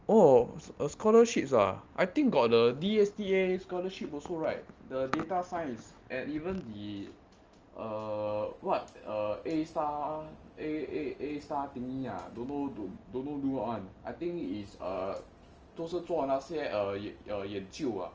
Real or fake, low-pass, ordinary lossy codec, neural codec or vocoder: real; 7.2 kHz; Opus, 24 kbps; none